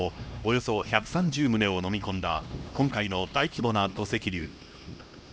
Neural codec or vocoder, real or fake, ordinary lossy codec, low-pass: codec, 16 kHz, 1 kbps, X-Codec, HuBERT features, trained on LibriSpeech; fake; none; none